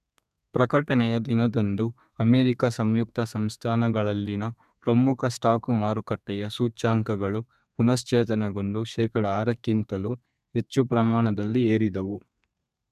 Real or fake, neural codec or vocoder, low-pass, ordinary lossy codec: fake; codec, 32 kHz, 1.9 kbps, SNAC; 14.4 kHz; none